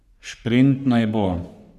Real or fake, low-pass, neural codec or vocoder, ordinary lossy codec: fake; 14.4 kHz; codec, 44.1 kHz, 3.4 kbps, Pupu-Codec; none